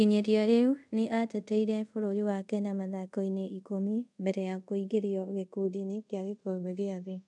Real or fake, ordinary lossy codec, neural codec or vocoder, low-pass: fake; none; codec, 24 kHz, 0.5 kbps, DualCodec; 10.8 kHz